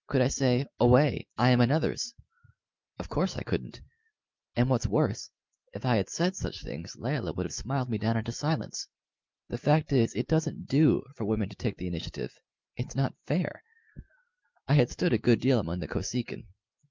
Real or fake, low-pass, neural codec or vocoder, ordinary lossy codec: real; 7.2 kHz; none; Opus, 24 kbps